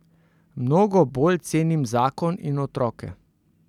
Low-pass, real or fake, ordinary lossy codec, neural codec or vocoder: 19.8 kHz; real; none; none